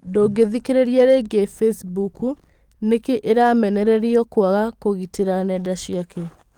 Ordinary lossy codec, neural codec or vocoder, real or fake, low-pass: Opus, 32 kbps; codec, 44.1 kHz, 7.8 kbps, DAC; fake; 19.8 kHz